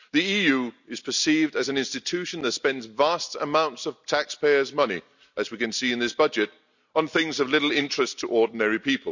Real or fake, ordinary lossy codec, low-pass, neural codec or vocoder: real; none; 7.2 kHz; none